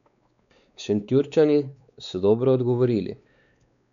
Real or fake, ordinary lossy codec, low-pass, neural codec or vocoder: fake; none; 7.2 kHz; codec, 16 kHz, 4 kbps, X-Codec, WavLM features, trained on Multilingual LibriSpeech